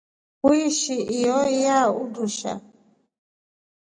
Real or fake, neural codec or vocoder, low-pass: real; none; 9.9 kHz